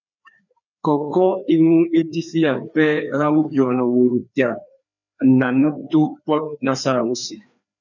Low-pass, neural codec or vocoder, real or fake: 7.2 kHz; codec, 16 kHz, 2 kbps, FreqCodec, larger model; fake